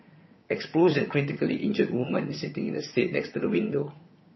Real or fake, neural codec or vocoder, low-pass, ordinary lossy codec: fake; vocoder, 22.05 kHz, 80 mel bands, HiFi-GAN; 7.2 kHz; MP3, 24 kbps